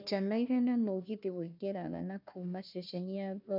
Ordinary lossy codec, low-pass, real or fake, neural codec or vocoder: none; 5.4 kHz; fake; codec, 16 kHz, 1 kbps, FunCodec, trained on Chinese and English, 50 frames a second